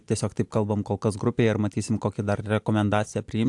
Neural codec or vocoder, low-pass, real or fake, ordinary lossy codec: none; 10.8 kHz; real; AAC, 64 kbps